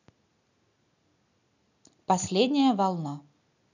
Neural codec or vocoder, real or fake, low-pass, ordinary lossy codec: none; real; 7.2 kHz; none